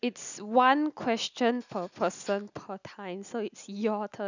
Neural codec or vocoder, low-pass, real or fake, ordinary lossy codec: none; 7.2 kHz; real; none